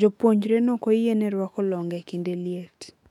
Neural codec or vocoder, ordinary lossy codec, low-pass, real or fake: autoencoder, 48 kHz, 128 numbers a frame, DAC-VAE, trained on Japanese speech; MP3, 96 kbps; 19.8 kHz; fake